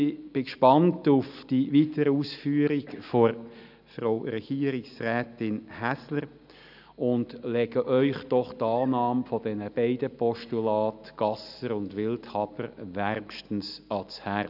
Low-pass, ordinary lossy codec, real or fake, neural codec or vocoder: 5.4 kHz; none; real; none